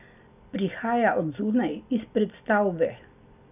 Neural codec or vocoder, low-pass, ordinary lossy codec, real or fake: none; 3.6 kHz; none; real